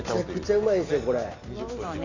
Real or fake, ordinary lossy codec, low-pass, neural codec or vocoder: real; none; 7.2 kHz; none